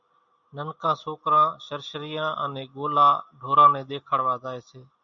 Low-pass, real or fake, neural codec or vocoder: 7.2 kHz; real; none